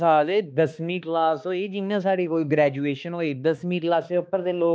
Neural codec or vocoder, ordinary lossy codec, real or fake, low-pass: codec, 16 kHz, 2 kbps, X-Codec, HuBERT features, trained on balanced general audio; none; fake; none